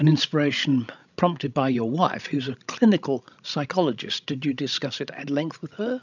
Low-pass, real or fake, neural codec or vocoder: 7.2 kHz; fake; codec, 16 kHz, 16 kbps, FreqCodec, larger model